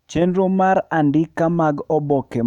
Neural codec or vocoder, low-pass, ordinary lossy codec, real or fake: autoencoder, 48 kHz, 128 numbers a frame, DAC-VAE, trained on Japanese speech; 19.8 kHz; none; fake